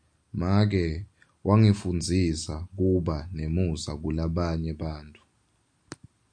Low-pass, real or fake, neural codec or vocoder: 9.9 kHz; real; none